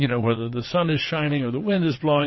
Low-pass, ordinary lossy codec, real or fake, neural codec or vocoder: 7.2 kHz; MP3, 24 kbps; fake; vocoder, 22.05 kHz, 80 mel bands, WaveNeXt